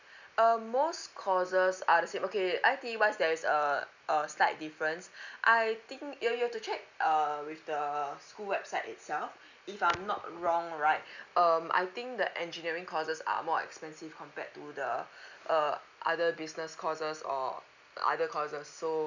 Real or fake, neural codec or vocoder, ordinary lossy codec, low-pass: real; none; none; 7.2 kHz